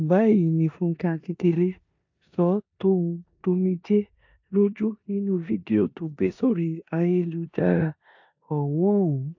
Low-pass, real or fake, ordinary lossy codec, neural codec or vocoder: 7.2 kHz; fake; AAC, 48 kbps; codec, 16 kHz in and 24 kHz out, 0.9 kbps, LongCat-Audio-Codec, four codebook decoder